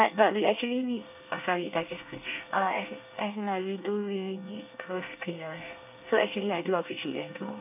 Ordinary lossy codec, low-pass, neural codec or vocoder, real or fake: none; 3.6 kHz; codec, 24 kHz, 1 kbps, SNAC; fake